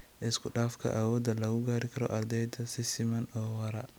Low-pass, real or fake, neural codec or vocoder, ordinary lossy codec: none; real; none; none